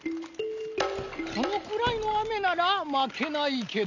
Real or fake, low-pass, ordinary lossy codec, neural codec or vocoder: real; 7.2 kHz; none; none